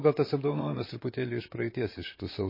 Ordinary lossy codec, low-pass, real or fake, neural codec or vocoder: MP3, 24 kbps; 5.4 kHz; fake; vocoder, 44.1 kHz, 128 mel bands, Pupu-Vocoder